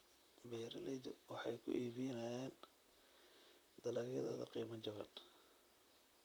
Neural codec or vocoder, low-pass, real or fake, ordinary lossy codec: vocoder, 44.1 kHz, 128 mel bands, Pupu-Vocoder; none; fake; none